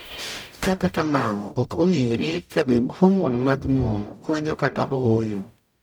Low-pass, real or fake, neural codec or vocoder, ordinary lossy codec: none; fake; codec, 44.1 kHz, 0.9 kbps, DAC; none